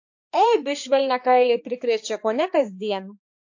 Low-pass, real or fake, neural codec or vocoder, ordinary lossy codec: 7.2 kHz; fake; codec, 16 kHz, 2 kbps, X-Codec, HuBERT features, trained on balanced general audio; AAC, 48 kbps